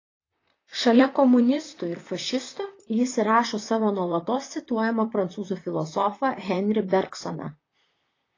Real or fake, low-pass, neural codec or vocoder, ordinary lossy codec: fake; 7.2 kHz; vocoder, 44.1 kHz, 128 mel bands, Pupu-Vocoder; AAC, 32 kbps